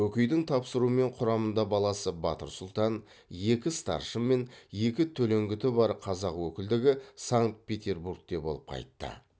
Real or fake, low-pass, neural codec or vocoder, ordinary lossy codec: real; none; none; none